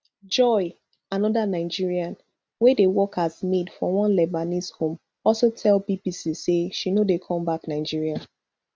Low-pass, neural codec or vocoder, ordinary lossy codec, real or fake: none; none; none; real